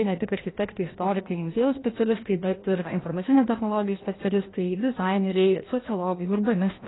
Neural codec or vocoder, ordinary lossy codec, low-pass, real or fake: codec, 16 kHz, 1 kbps, FreqCodec, larger model; AAC, 16 kbps; 7.2 kHz; fake